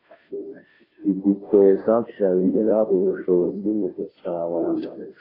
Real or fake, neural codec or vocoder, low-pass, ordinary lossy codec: fake; codec, 16 kHz, 0.5 kbps, FunCodec, trained on Chinese and English, 25 frames a second; 5.4 kHz; AAC, 24 kbps